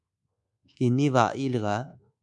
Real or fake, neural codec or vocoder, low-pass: fake; codec, 24 kHz, 1.2 kbps, DualCodec; 10.8 kHz